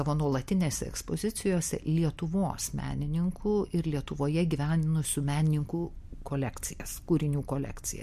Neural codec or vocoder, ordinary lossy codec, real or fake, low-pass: none; MP3, 64 kbps; real; 14.4 kHz